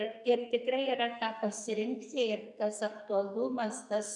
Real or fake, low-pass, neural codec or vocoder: fake; 10.8 kHz; codec, 44.1 kHz, 2.6 kbps, SNAC